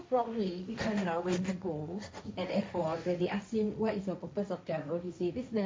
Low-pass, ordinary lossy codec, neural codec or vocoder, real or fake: none; none; codec, 16 kHz, 1.1 kbps, Voila-Tokenizer; fake